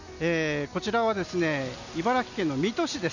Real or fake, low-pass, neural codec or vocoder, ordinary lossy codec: real; 7.2 kHz; none; none